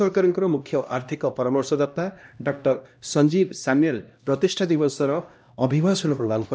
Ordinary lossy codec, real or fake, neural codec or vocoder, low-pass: none; fake; codec, 16 kHz, 1 kbps, X-Codec, HuBERT features, trained on LibriSpeech; none